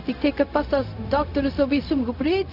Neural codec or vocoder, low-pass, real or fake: codec, 16 kHz, 0.4 kbps, LongCat-Audio-Codec; 5.4 kHz; fake